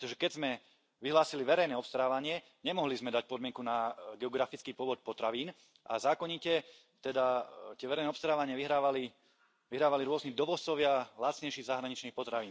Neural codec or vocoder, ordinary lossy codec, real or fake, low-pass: none; none; real; none